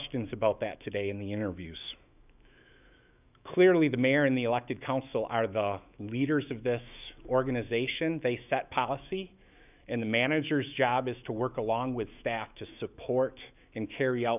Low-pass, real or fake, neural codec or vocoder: 3.6 kHz; fake; autoencoder, 48 kHz, 128 numbers a frame, DAC-VAE, trained on Japanese speech